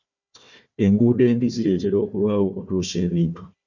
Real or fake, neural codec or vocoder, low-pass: fake; codec, 16 kHz, 1 kbps, FunCodec, trained on Chinese and English, 50 frames a second; 7.2 kHz